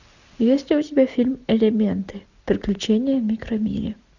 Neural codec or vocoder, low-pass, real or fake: none; 7.2 kHz; real